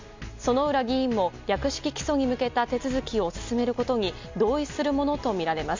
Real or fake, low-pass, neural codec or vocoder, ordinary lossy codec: real; 7.2 kHz; none; MP3, 64 kbps